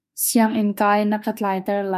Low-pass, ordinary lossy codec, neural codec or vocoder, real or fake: 14.4 kHz; MP3, 96 kbps; autoencoder, 48 kHz, 32 numbers a frame, DAC-VAE, trained on Japanese speech; fake